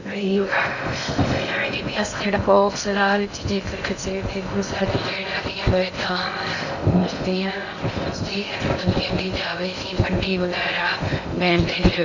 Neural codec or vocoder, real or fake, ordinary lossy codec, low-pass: codec, 16 kHz in and 24 kHz out, 0.6 kbps, FocalCodec, streaming, 2048 codes; fake; none; 7.2 kHz